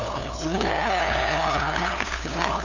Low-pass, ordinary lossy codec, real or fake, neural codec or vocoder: 7.2 kHz; none; fake; codec, 16 kHz, 2 kbps, FunCodec, trained on LibriTTS, 25 frames a second